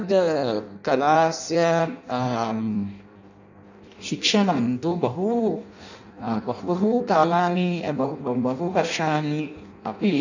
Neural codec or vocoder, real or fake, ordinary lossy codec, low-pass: codec, 16 kHz in and 24 kHz out, 0.6 kbps, FireRedTTS-2 codec; fake; none; 7.2 kHz